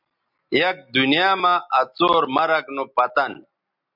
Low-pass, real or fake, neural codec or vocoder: 5.4 kHz; real; none